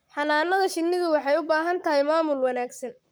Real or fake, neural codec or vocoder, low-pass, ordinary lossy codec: fake; codec, 44.1 kHz, 7.8 kbps, Pupu-Codec; none; none